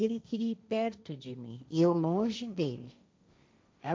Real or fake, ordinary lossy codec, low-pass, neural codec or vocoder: fake; none; none; codec, 16 kHz, 1.1 kbps, Voila-Tokenizer